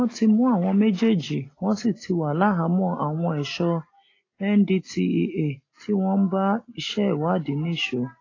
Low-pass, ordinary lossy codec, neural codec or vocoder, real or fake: 7.2 kHz; AAC, 32 kbps; none; real